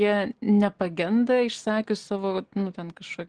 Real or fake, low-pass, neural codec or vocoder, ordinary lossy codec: real; 9.9 kHz; none; Opus, 16 kbps